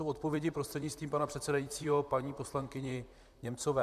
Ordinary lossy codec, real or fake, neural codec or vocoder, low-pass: MP3, 96 kbps; fake; vocoder, 44.1 kHz, 128 mel bands, Pupu-Vocoder; 14.4 kHz